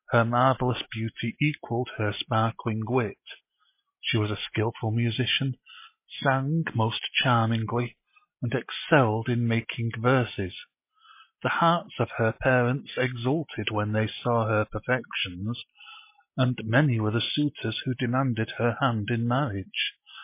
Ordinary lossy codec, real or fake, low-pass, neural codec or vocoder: MP3, 24 kbps; real; 3.6 kHz; none